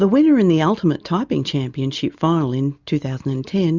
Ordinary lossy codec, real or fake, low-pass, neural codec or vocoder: Opus, 64 kbps; real; 7.2 kHz; none